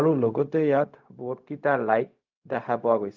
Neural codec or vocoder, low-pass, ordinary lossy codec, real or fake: codec, 16 kHz, 0.4 kbps, LongCat-Audio-Codec; 7.2 kHz; Opus, 24 kbps; fake